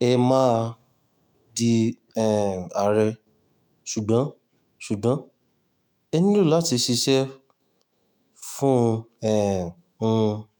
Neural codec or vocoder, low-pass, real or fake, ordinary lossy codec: autoencoder, 48 kHz, 128 numbers a frame, DAC-VAE, trained on Japanese speech; none; fake; none